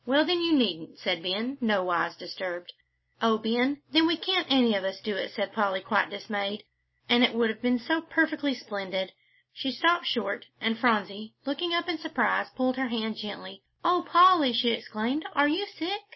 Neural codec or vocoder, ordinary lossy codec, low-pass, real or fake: none; MP3, 24 kbps; 7.2 kHz; real